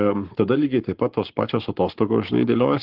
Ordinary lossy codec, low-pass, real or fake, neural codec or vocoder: Opus, 16 kbps; 5.4 kHz; fake; codec, 44.1 kHz, 7.8 kbps, Pupu-Codec